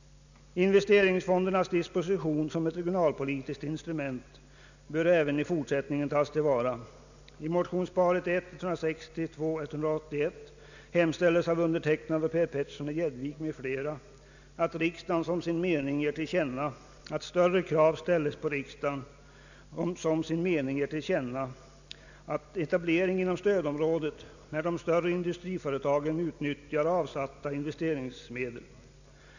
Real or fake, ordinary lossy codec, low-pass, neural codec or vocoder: real; none; 7.2 kHz; none